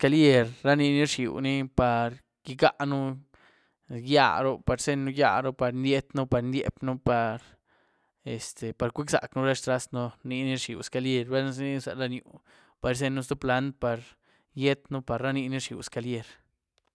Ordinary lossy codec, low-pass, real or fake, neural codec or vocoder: none; none; real; none